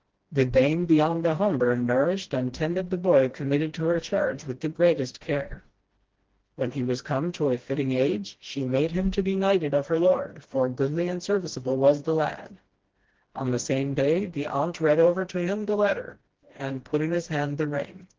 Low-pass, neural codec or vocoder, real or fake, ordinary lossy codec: 7.2 kHz; codec, 16 kHz, 1 kbps, FreqCodec, smaller model; fake; Opus, 16 kbps